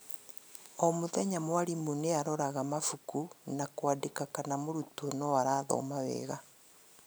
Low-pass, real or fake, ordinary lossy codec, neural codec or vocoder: none; real; none; none